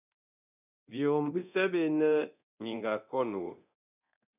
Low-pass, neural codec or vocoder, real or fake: 3.6 kHz; codec, 24 kHz, 0.9 kbps, DualCodec; fake